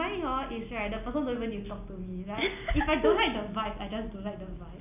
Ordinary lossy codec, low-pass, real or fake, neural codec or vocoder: none; 3.6 kHz; real; none